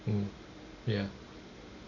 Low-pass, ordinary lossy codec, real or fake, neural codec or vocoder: 7.2 kHz; none; real; none